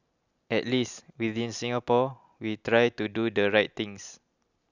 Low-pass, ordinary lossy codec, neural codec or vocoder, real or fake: 7.2 kHz; none; none; real